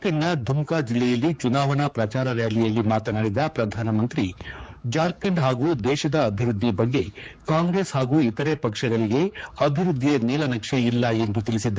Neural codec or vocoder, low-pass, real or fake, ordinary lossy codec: codec, 16 kHz, 4 kbps, X-Codec, HuBERT features, trained on general audio; none; fake; none